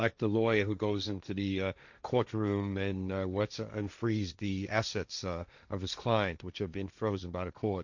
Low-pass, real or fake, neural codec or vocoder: 7.2 kHz; fake; codec, 16 kHz, 1.1 kbps, Voila-Tokenizer